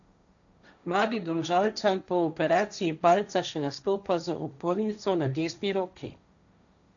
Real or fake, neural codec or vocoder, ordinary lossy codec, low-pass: fake; codec, 16 kHz, 1.1 kbps, Voila-Tokenizer; none; 7.2 kHz